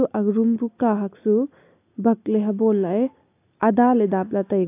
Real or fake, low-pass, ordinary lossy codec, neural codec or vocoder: real; 3.6 kHz; AAC, 24 kbps; none